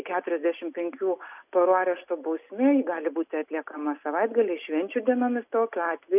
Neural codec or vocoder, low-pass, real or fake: none; 3.6 kHz; real